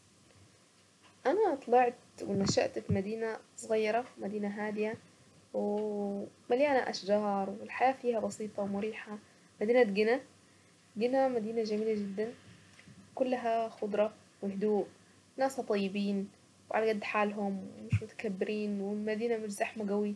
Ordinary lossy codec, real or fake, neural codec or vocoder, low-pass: none; real; none; none